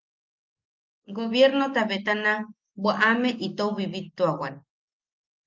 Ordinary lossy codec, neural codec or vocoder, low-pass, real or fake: Opus, 24 kbps; none; 7.2 kHz; real